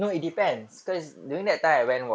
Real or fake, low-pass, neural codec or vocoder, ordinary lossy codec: real; none; none; none